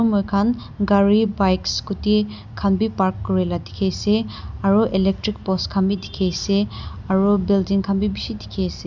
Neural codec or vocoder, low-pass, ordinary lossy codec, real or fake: none; 7.2 kHz; none; real